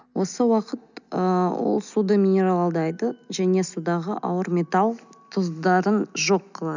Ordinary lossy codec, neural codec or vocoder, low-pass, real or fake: none; none; 7.2 kHz; real